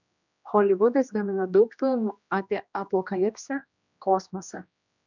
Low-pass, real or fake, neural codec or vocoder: 7.2 kHz; fake; codec, 16 kHz, 1 kbps, X-Codec, HuBERT features, trained on general audio